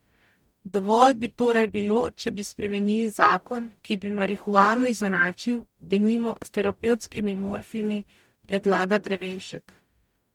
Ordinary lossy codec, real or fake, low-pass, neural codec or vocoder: MP3, 96 kbps; fake; 19.8 kHz; codec, 44.1 kHz, 0.9 kbps, DAC